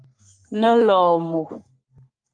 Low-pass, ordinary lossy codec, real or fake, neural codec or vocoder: 9.9 kHz; Opus, 32 kbps; fake; codec, 44.1 kHz, 3.4 kbps, Pupu-Codec